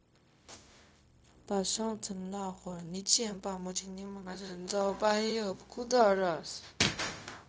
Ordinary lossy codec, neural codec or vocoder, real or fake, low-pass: none; codec, 16 kHz, 0.4 kbps, LongCat-Audio-Codec; fake; none